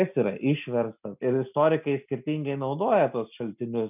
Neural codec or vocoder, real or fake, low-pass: none; real; 3.6 kHz